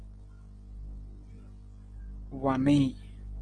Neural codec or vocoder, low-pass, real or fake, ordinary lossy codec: none; 9.9 kHz; real; Opus, 16 kbps